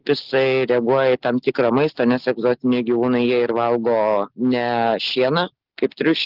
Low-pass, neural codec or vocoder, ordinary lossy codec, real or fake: 5.4 kHz; none; Opus, 16 kbps; real